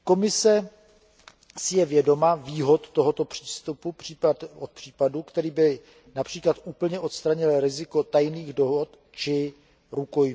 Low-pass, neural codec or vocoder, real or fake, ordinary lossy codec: none; none; real; none